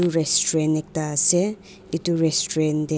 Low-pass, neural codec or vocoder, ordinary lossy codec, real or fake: none; none; none; real